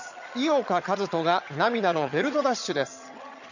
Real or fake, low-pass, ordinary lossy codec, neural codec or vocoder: fake; 7.2 kHz; none; vocoder, 22.05 kHz, 80 mel bands, HiFi-GAN